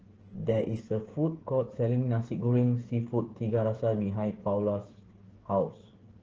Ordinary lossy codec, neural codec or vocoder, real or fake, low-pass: Opus, 16 kbps; codec, 16 kHz, 8 kbps, FreqCodec, smaller model; fake; 7.2 kHz